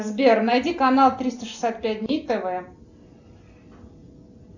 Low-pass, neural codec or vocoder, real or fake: 7.2 kHz; none; real